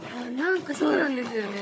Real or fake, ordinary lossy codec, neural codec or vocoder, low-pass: fake; none; codec, 16 kHz, 16 kbps, FunCodec, trained on Chinese and English, 50 frames a second; none